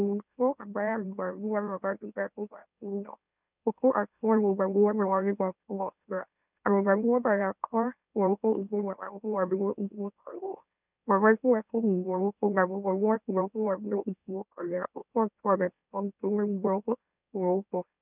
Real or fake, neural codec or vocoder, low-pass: fake; autoencoder, 44.1 kHz, a latent of 192 numbers a frame, MeloTTS; 3.6 kHz